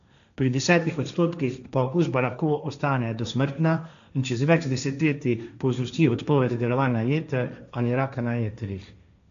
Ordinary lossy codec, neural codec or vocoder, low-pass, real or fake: none; codec, 16 kHz, 1.1 kbps, Voila-Tokenizer; 7.2 kHz; fake